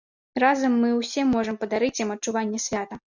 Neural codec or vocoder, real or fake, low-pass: none; real; 7.2 kHz